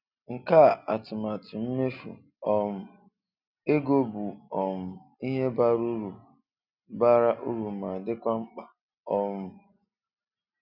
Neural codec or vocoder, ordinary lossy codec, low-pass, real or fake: none; none; 5.4 kHz; real